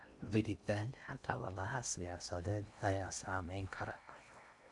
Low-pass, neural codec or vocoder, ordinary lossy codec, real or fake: 10.8 kHz; codec, 16 kHz in and 24 kHz out, 0.6 kbps, FocalCodec, streaming, 4096 codes; none; fake